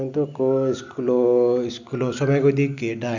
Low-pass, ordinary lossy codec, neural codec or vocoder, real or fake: 7.2 kHz; none; none; real